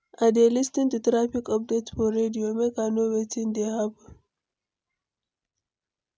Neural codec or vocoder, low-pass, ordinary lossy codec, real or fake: none; none; none; real